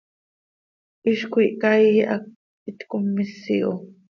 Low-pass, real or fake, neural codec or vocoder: 7.2 kHz; real; none